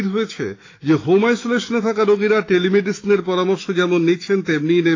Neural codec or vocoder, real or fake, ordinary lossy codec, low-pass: autoencoder, 48 kHz, 128 numbers a frame, DAC-VAE, trained on Japanese speech; fake; AAC, 32 kbps; 7.2 kHz